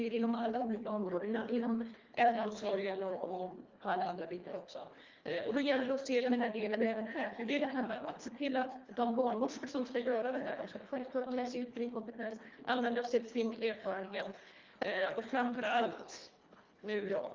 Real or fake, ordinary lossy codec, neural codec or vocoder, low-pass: fake; Opus, 24 kbps; codec, 24 kHz, 1.5 kbps, HILCodec; 7.2 kHz